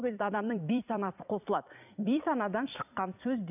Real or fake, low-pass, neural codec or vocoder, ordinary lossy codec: fake; 3.6 kHz; vocoder, 44.1 kHz, 128 mel bands every 256 samples, BigVGAN v2; none